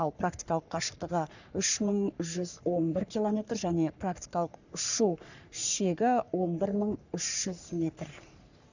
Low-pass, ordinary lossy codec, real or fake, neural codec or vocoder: 7.2 kHz; none; fake; codec, 44.1 kHz, 3.4 kbps, Pupu-Codec